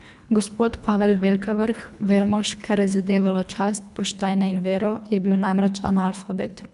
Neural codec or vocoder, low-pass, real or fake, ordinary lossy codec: codec, 24 kHz, 1.5 kbps, HILCodec; 10.8 kHz; fake; none